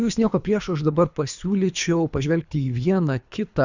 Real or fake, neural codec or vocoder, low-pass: fake; codec, 24 kHz, 3 kbps, HILCodec; 7.2 kHz